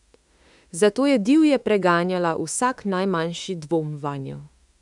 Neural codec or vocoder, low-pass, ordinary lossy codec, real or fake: autoencoder, 48 kHz, 32 numbers a frame, DAC-VAE, trained on Japanese speech; 10.8 kHz; none; fake